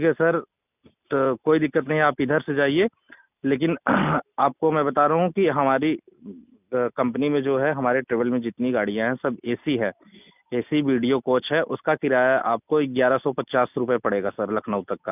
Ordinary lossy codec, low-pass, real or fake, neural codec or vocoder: none; 3.6 kHz; real; none